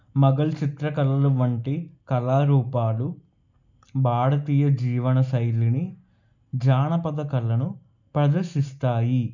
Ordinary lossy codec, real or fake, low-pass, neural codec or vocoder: none; real; 7.2 kHz; none